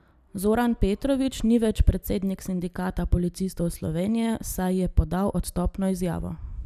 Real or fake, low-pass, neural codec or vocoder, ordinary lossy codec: real; 14.4 kHz; none; none